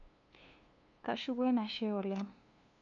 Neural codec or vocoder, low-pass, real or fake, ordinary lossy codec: codec, 16 kHz, 2 kbps, FunCodec, trained on LibriTTS, 25 frames a second; 7.2 kHz; fake; none